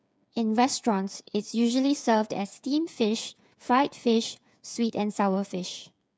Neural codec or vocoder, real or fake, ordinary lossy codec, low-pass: codec, 16 kHz, 8 kbps, FreqCodec, smaller model; fake; none; none